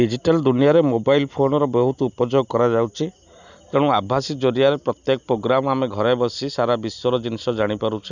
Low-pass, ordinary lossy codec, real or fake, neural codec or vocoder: 7.2 kHz; none; real; none